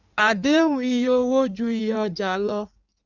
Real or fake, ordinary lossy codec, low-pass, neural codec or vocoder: fake; Opus, 64 kbps; 7.2 kHz; codec, 16 kHz in and 24 kHz out, 1.1 kbps, FireRedTTS-2 codec